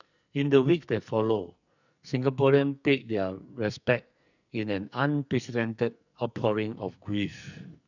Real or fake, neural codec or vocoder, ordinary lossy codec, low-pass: fake; codec, 44.1 kHz, 2.6 kbps, SNAC; none; 7.2 kHz